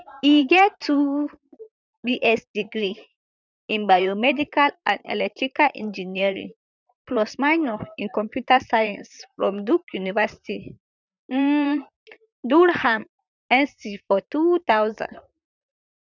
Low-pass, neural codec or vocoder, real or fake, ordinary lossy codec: 7.2 kHz; vocoder, 44.1 kHz, 128 mel bands, Pupu-Vocoder; fake; none